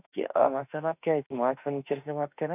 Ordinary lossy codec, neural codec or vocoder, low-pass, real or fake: none; autoencoder, 48 kHz, 32 numbers a frame, DAC-VAE, trained on Japanese speech; 3.6 kHz; fake